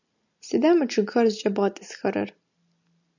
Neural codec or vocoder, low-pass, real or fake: none; 7.2 kHz; real